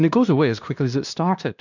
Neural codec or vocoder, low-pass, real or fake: codec, 16 kHz, 1 kbps, X-Codec, WavLM features, trained on Multilingual LibriSpeech; 7.2 kHz; fake